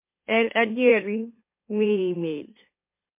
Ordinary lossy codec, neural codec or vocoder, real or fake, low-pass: MP3, 24 kbps; autoencoder, 44.1 kHz, a latent of 192 numbers a frame, MeloTTS; fake; 3.6 kHz